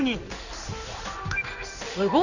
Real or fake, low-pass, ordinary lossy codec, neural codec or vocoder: fake; 7.2 kHz; none; codec, 16 kHz in and 24 kHz out, 1 kbps, XY-Tokenizer